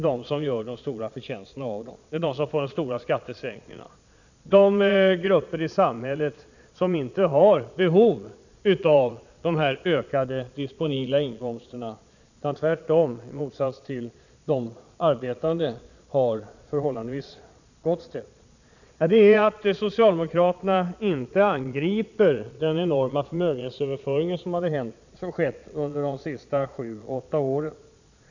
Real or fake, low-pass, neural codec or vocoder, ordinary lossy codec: fake; 7.2 kHz; vocoder, 22.05 kHz, 80 mel bands, Vocos; none